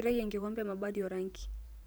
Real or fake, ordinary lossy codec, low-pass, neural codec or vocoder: fake; none; none; vocoder, 44.1 kHz, 128 mel bands every 512 samples, BigVGAN v2